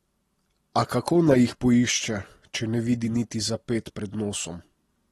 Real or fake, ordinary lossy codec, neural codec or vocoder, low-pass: real; AAC, 32 kbps; none; 19.8 kHz